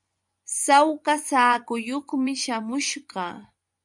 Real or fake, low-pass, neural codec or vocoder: fake; 10.8 kHz; vocoder, 24 kHz, 100 mel bands, Vocos